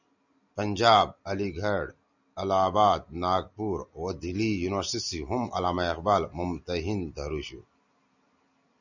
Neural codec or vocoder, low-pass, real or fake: none; 7.2 kHz; real